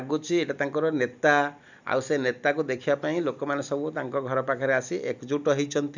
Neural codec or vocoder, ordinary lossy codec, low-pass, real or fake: none; none; 7.2 kHz; real